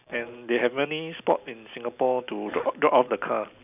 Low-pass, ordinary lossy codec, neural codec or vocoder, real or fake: 3.6 kHz; none; none; real